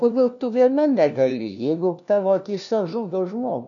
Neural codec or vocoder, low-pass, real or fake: codec, 16 kHz, 1 kbps, FunCodec, trained on LibriTTS, 50 frames a second; 7.2 kHz; fake